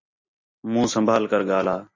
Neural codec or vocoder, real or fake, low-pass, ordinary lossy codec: none; real; 7.2 kHz; MP3, 32 kbps